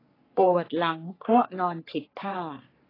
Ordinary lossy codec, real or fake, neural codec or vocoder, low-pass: AAC, 24 kbps; fake; codec, 44.1 kHz, 3.4 kbps, Pupu-Codec; 5.4 kHz